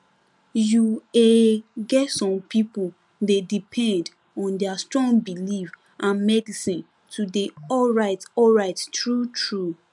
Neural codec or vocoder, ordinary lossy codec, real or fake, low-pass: none; none; real; none